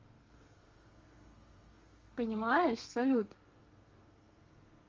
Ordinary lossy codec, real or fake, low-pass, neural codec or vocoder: Opus, 32 kbps; fake; 7.2 kHz; codec, 32 kHz, 1.9 kbps, SNAC